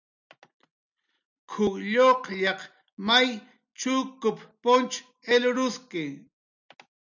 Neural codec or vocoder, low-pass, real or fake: none; 7.2 kHz; real